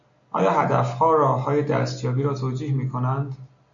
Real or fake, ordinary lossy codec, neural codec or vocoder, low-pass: real; AAC, 64 kbps; none; 7.2 kHz